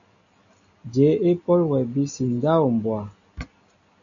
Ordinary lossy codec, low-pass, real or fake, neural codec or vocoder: AAC, 64 kbps; 7.2 kHz; real; none